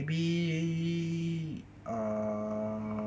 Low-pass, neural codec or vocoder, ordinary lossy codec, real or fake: none; none; none; real